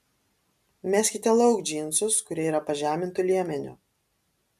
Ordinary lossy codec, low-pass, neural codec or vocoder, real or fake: MP3, 96 kbps; 14.4 kHz; none; real